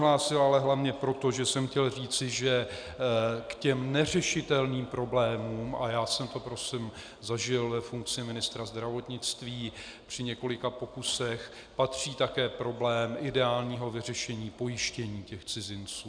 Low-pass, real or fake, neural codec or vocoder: 9.9 kHz; real; none